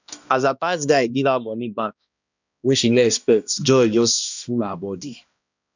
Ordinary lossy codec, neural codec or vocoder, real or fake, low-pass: none; codec, 16 kHz, 1 kbps, X-Codec, HuBERT features, trained on balanced general audio; fake; 7.2 kHz